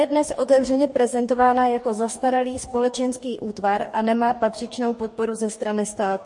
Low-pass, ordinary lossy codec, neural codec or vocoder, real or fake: 10.8 kHz; MP3, 48 kbps; codec, 44.1 kHz, 2.6 kbps, DAC; fake